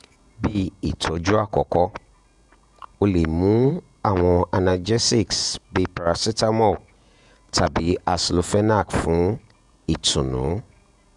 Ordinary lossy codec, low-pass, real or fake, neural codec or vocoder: none; 10.8 kHz; fake; vocoder, 48 kHz, 128 mel bands, Vocos